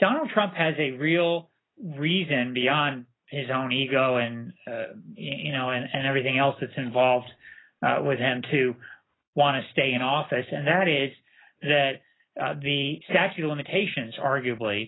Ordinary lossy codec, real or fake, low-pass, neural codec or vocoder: AAC, 16 kbps; real; 7.2 kHz; none